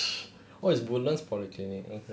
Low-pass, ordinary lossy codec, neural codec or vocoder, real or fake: none; none; none; real